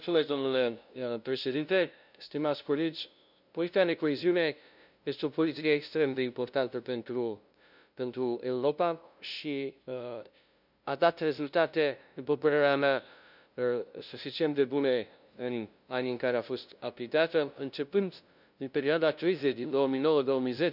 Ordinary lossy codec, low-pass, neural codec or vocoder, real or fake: none; 5.4 kHz; codec, 16 kHz, 0.5 kbps, FunCodec, trained on LibriTTS, 25 frames a second; fake